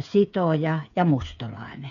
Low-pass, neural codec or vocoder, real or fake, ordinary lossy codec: 7.2 kHz; codec, 16 kHz, 8 kbps, FreqCodec, smaller model; fake; none